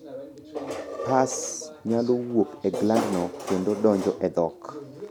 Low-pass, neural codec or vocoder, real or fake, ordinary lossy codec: 19.8 kHz; none; real; none